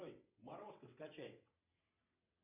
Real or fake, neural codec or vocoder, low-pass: fake; vocoder, 22.05 kHz, 80 mel bands, WaveNeXt; 3.6 kHz